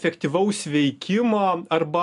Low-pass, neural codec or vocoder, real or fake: 10.8 kHz; none; real